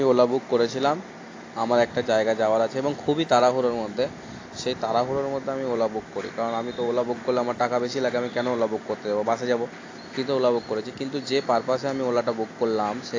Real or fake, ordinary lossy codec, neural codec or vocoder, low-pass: real; AAC, 32 kbps; none; 7.2 kHz